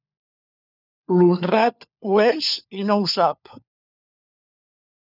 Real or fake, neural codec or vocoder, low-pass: fake; codec, 16 kHz, 4 kbps, FunCodec, trained on LibriTTS, 50 frames a second; 5.4 kHz